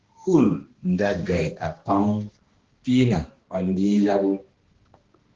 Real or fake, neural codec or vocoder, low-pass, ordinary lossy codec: fake; codec, 16 kHz, 1 kbps, X-Codec, HuBERT features, trained on balanced general audio; 7.2 kHz; Opus, 16 kbps